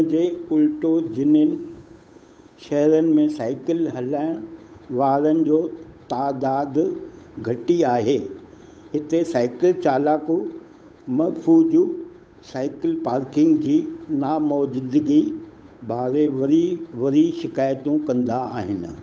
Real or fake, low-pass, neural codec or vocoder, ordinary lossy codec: fake; none; codec, 16 kHz, 8 kbps, FunCodec, trained on Chinese and English, 25 frames a second; none